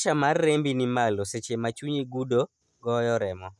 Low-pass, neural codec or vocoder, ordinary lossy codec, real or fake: none; none; none; real